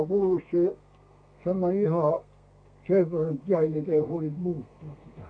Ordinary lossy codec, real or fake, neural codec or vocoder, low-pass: AAC, 48 kbps; fake; codec, 32 kHz, 1.9 kbps, SNAC; 9.9 kHz